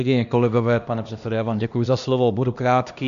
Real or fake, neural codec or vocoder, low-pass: fake; codec, 16 kHz, 1 kbps, X-Codec, HuBERT features, trained on LibriSpeech; 7.2 kHz